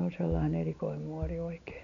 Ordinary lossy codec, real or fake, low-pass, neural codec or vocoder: none; real; 7.2 kHz; none